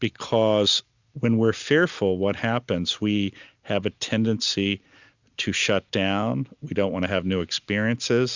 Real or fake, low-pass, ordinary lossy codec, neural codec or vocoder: real; 7.2 kHz; Opus, 64 kbps; none